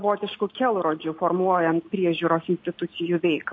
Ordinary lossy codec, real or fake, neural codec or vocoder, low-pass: MP3, 32 kbps; real; none; 7.2 kHz